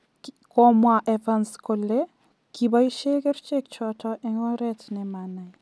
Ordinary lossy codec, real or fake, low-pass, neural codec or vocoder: none; real; none; none